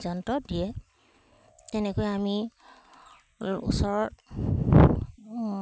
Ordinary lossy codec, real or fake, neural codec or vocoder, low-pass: none; real; none; none